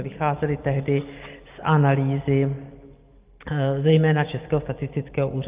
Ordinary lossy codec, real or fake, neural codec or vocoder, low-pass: Opus, 24 kbps; real; none; 3.6 kHz